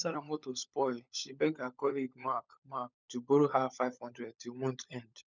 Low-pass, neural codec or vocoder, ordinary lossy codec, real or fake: 7.2 kHz; codec, 16 kHz, 16 kbps, FunCodec, trained on LibriTTS, 50 frames a second; none; fake